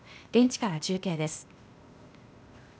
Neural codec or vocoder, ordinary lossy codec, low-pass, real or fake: codec, 16 kHz, 0.8 kbps, ZipCodec; none; none; fake